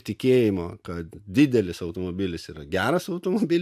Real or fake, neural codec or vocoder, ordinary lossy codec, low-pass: real; none; AAC, 96 kbps; 14.4 kHz